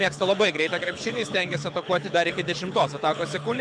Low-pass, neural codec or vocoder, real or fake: 9.9 kHz; codec, 24 kHz, 6 kbps, HILCodec; fake